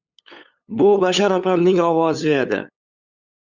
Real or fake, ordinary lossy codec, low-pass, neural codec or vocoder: fake; Opus, 64 kbps; 7.2 kHz; codec, 16 kHz, 8 kbps, FunCodec, trained on LibriTTS, 25 frames a second